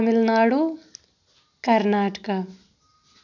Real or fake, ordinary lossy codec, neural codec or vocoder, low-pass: real; none; none; 7.2 kHz